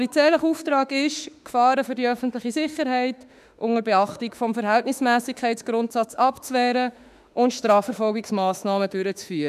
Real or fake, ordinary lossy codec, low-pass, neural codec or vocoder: fake; none; 14.4 kHz; autoencoder, 48 kHz, 32 numbers a frame, DAC-VAE, trained on Japanese speech